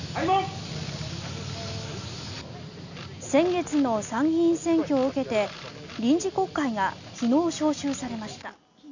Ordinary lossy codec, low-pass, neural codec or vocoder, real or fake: none; 7.2 kHz; none; real